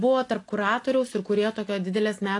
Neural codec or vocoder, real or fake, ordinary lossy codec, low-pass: none; real; AAC, 48 kbps; 10.8 kHz